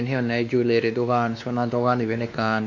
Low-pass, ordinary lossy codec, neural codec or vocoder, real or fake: 7.2 kHz; MP3, 48 kbps; codec, 16 kHz, 2 kbps, X-Codec, WavLM features, trained on Multilingual LibriSpeech; fake